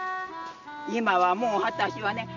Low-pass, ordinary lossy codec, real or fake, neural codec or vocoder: 7.2 kHz; none; real; none